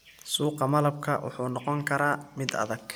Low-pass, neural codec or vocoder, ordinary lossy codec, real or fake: none; none; none; real